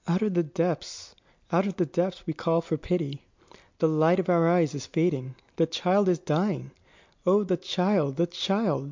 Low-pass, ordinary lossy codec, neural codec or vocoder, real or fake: 7.2 kHz; MP3, 64 kbps; none; real